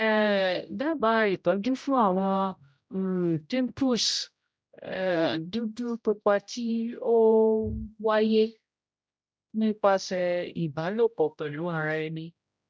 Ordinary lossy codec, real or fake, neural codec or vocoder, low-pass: none; fake; codec, 16 kHz, 0.5 kbps, X-Codec, HuBERT features, trained on general audio; none